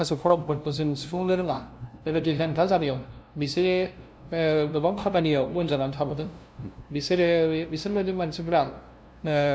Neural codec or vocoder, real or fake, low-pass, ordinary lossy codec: codec, 16 kHz, 0.5 kbps, FunCodec, trained on LibriTTS, 25 frames a second; fake; none; none